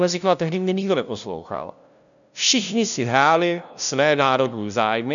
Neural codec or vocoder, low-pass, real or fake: codec, 16 kHz, 0.5 kbps, FunCodec, trained on LibriTTS, 25 frames a second; 7.2 kHz; fake